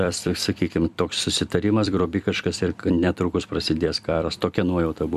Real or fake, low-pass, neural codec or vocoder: fake; 14.4 kHz; vocoder, 44.1 kHz, 128 mel bands every 256 samples, BigVGAN v2